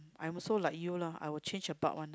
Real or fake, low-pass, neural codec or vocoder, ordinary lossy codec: real; none; none; none